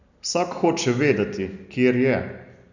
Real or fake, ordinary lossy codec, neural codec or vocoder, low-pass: real; none; none; 7.2 kHz